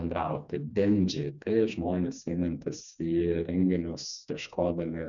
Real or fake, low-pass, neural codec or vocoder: fake; 7.2 kHz; codec, 16 kHz, 2 kbps, FreqCodec, smaller model